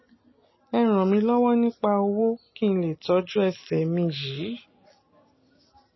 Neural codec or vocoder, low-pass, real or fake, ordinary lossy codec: none; 7.2 kHz; real; MP3, 24 kbps